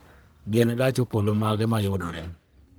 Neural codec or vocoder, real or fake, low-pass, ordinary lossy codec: codec, 44.1 kHz, 1.7 kbps, Pupu-Codec; fake; none; none